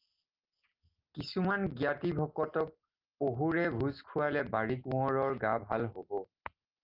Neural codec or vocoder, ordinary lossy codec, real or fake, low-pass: none; Opus, 24 kbps; real; 5.4 kHz